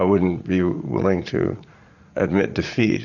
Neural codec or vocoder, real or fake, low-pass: vocoder, 44.1 kHz, 80 mel bands, Vocos; fake; 7.2 kHz